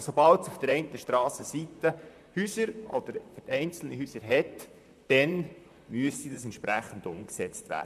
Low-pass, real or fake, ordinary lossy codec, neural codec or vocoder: 14.4 kHz; fake; none; vocoder, 44.1 kHz, 128 mel bands, Pupu-Vocoder